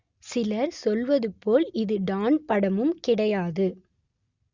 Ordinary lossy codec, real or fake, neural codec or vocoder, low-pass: Opus, 64 kbps; real; none; 7.2 kHz